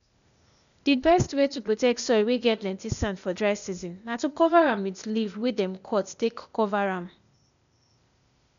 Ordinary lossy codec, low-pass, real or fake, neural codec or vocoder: none; 7.2 kHz; fake; codec, 16 kHz, 0.8 kbps, ZipCodec